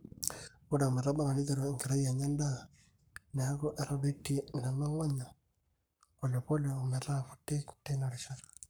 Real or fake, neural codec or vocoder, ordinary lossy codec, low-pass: fake; codec, 44.1 kHz, 7.8 kbps, Pupu-Codec; none; none